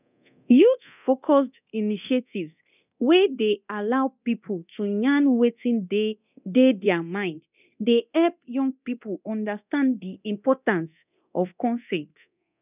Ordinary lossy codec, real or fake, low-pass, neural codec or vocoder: none; fake; 3.6 kHz; codec, 24 kHz, 0.9 kbps, DualCodec